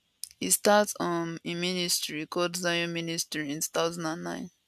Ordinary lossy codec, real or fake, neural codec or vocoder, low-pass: none; real; none; 14.4 kHz